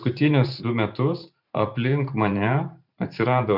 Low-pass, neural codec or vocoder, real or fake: 5.4 kHz; vocoder, 44.1 kHz, 128 mel bands every 512 samples, BigVGAN v2; fake